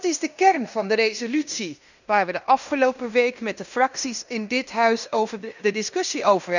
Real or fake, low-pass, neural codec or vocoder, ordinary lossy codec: fake; 7.2 kHz; codec, 16 kHz in and 24 kHz out, 0.9 kbps, LongCat-Audio-Codec, fine tuned four codebook decoder; none